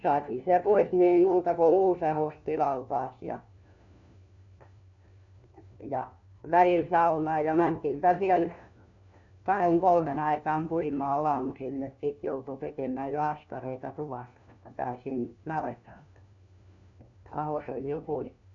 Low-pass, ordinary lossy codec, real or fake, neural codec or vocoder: 7.2 kHz; none; fake; codec, 16 kHz, 1 kbps, FunCodec, trained on LibriTTS, 50 frames a second